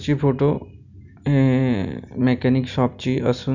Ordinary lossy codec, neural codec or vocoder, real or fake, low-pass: none; none; real; 7.2 kHz